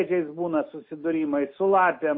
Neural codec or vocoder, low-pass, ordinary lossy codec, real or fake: none; 5.4 kHz; MP3, 24 kbps; real